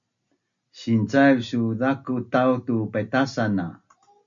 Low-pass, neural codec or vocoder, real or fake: 7.2 kHz; none; real